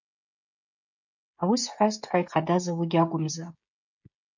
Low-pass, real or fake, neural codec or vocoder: 7.2 kHz; fake; codec, 16 kHz, 16 kbps, FreqCodec, smaller model